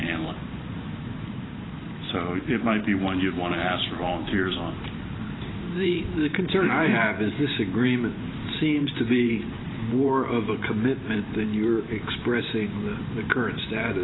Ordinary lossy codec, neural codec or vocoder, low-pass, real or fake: AAC, 16 kbps; vocoder, 44.1 kHz, 128 mel bands every 512 samples, BigVGAN v2; 7.2 kHz; fake